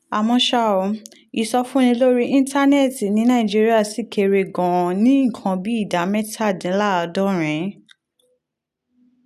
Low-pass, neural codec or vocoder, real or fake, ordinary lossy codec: 14.4 kHz; none; real; none